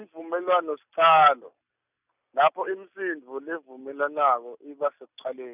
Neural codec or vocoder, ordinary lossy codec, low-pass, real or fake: none; none; 3.6 kHz; real